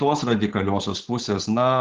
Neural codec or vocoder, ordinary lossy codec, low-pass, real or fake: codec, 16 kHz, 8 kbps, FunCodec, trained on Chinese and English, 25 frames a second; Opus, 16 kbps; 7.2 kHz; fake